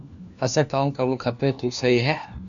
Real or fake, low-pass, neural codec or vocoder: fake; 7.2 kHz; codec, 16 kHz, 1 kbps, FunCodec, trained on LibriTTS, 50 frames a second